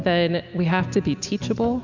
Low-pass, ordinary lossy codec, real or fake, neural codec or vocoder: 7.2 kHz; MP3, 64 kbps; real; none